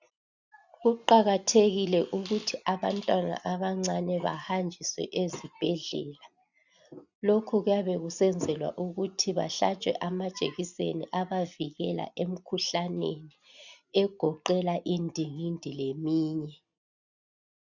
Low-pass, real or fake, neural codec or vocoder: 7.2 kHz; real; none